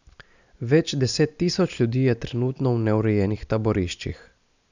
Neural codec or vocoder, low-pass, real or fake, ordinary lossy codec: none; 7.2 kHz; real; none